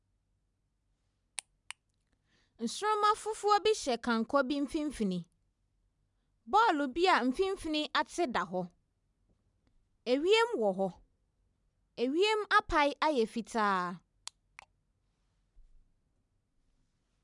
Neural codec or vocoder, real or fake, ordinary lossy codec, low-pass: none; real; none; 10.8 kHz